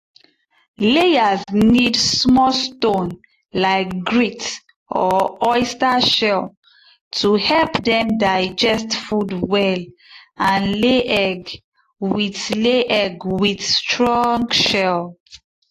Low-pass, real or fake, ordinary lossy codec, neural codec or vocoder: 14.4 kHz; real; AAC, 48 kbps; none